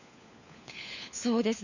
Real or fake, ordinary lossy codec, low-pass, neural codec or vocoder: fake; none; 7.2 kHz; codec, 16 kHz, 4 kbps, FunCodec, trained on LibriTTS, 50 frames a second